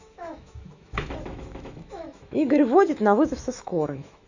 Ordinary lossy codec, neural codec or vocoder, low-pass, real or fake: Opus, 64 kbps; autoencoder, 48 kHz, 128 numbers a frame, DAC-VAE, trained on Japanese speech; 7.2 kHz; fake